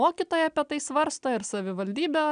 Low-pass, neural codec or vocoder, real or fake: 9.9 kHz; none; real